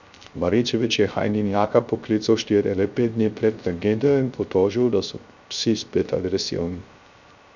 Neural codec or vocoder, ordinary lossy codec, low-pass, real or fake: codec, 16 kHz, 0.3 kbps, FocalCodec; none; 7.2 kHz; fake